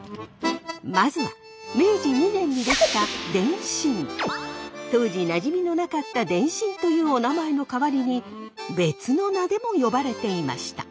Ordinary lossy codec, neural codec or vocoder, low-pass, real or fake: none; none; none; real